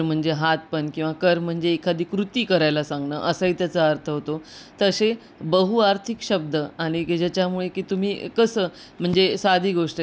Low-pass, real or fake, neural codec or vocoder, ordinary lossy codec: none; real; none; none